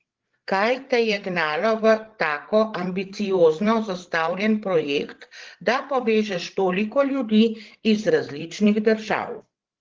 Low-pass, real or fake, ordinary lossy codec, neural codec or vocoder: 7.2 kHz; fake; Opus, 16 kbps; codec, 16 kHz, 8 kbps, FreqCodec, larger model